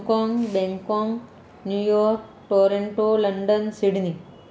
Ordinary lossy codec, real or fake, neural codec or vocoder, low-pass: none; real; none; none